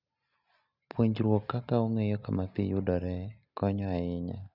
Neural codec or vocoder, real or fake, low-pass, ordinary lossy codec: none; real; 5.4 kHz; none